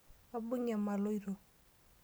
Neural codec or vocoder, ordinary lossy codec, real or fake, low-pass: none; none; real; none